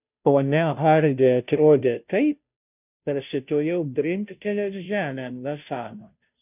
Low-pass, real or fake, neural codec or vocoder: 3.6 kHz; fake; codec, 16 kHz, 0.5 kbps, FunCodec, trained on Chinese and English, 25 frames a second